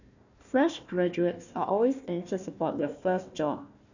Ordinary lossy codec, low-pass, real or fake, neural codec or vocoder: none; 7.2 kHz; fake; codec, 16 kHz, 1 kbps, FunCodec, trained on Chinese and English, 50 frames a second